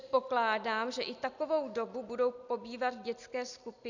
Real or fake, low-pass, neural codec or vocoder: real; 7.2 kHz; none